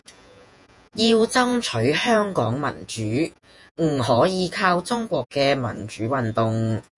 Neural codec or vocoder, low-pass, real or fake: vocoder, 48 kHz, 128 mel bands, Vocos; 10.8 kHz; fake